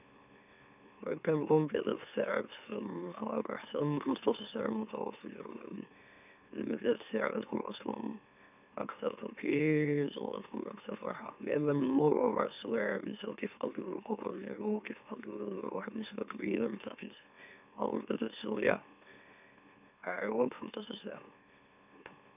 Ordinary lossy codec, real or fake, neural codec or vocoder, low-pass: none; fake; autoencoder, 44.1 kHz, a latent of 192 numbers a frame, MeloTTS; 3.6 kHz